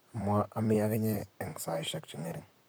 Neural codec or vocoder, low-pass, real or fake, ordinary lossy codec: vocoder, 44.1 kHz, 128 mel bands, Pupu-Vocoder; none; fake; none